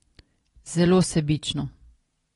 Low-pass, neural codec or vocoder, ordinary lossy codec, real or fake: 10.8 kHz; none; AAC, 32 kbps; real